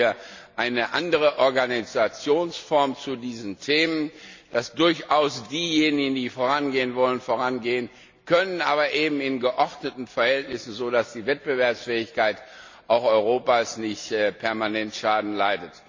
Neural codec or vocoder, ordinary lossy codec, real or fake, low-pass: none; MP3, 64 kbps; real; 7.2 kHz